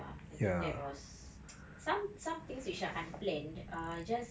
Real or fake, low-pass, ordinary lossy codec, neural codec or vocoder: real; none; none; none